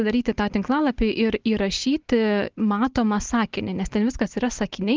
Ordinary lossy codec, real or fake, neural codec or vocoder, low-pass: Opus, 16 kbps; real; none; 7.2 kHz